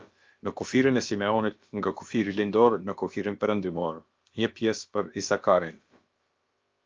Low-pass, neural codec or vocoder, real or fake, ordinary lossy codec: 7.2 kHz; codec, 16 kHz, about 1 kbps, DyCAST, with the encoder's durations; fake; Opus, 24 kbps